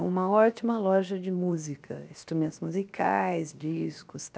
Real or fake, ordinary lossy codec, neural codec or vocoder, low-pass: fake; none; codec, 16 kHz, 0.7 kbps, FocalCodec; none